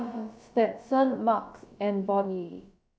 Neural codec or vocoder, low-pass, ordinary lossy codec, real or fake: codec, 16 kHz, about 1 kbps, DyCAST, with the encoder's durations; none; none; fake